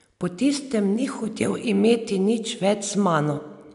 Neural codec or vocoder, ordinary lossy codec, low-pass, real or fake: none; none; 10.8 kHz; real